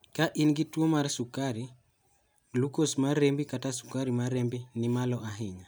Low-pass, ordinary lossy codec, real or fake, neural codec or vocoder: none; none; real; none